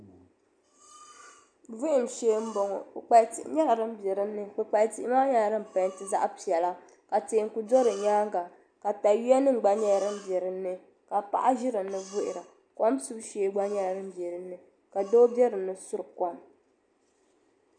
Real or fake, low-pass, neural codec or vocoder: real; 9.9 kHz; none